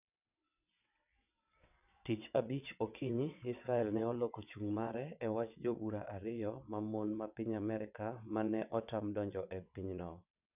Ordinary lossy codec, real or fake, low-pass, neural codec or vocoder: none; fake; 3.6 kHz; vocoder, 22.05 kHz, 80 mel bands, WaveNeXt